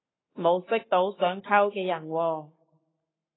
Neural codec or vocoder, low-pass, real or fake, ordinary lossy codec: codec, 44.1 kHz, 7.8 kbps, Pupu-Codec; 7.2 kHz; fake; AAC, 16 kbps